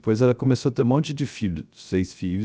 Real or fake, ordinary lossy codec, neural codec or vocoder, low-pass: fake; none; codec, 16 kHz, 0.3 kbps, FocalCodec; none